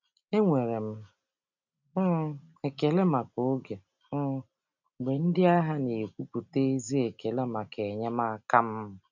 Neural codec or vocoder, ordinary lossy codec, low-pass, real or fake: none; none; 7.2 kHz; real